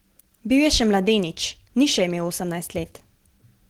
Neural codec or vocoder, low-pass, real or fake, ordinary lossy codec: none; 19.8 kHz; real; Opus, 16 kbps